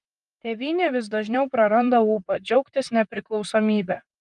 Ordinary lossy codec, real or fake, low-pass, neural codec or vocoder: Opus, 32 kbps; fake; 10.8 kHz; vocoder, 44.1 kHz, 128 mel bands, Pupu-Vocoder